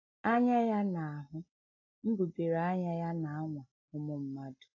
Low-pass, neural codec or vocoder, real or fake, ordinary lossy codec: 7.2 kHz; none; real; AAC, 32 kbps